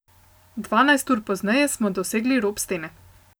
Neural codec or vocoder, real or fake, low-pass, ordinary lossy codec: none; real; none; none